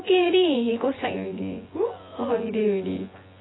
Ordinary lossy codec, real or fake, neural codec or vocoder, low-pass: AAC, 16 kbps; fake; vocoder, 24 kHz, 100 mel bands, Vocos; 7.2 kHz